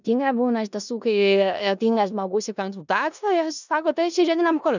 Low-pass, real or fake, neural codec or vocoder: 7.2 kHz; fake; codec, 16 kHz in and 24 kHz out, 0.4 kbps, LongCat-Audio-Codec, four codebook decoder